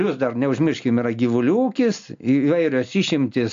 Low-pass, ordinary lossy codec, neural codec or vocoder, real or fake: 7.2 kHz; AAC, 64 kbps; none; real